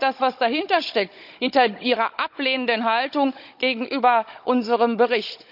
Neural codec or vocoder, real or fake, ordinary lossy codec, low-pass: codec, 16 kHz, 16 kbps, FunCodec, trained on Chinese and English, 50 frames a second; fake; none; 5.4 kHz